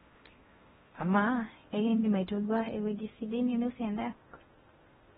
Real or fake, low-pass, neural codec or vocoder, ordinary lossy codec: fake; 10.8 kHz; codec, 16 kHz in and 24 kHz out, 0.6 kbps, FocalCodec, streaming, 4096 codes; AAC, 16 kbps